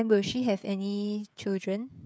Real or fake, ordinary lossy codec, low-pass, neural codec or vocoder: fake; none; none; codec, 16 kHz, 16 kbps, FreqCodec, smaller model